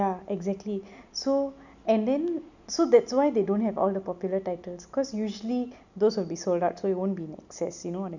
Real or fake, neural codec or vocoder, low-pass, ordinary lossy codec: real; none; 7.2 kHz; none